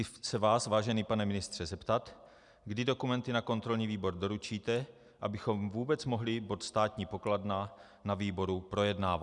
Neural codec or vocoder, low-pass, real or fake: none; 10.8 kHz; real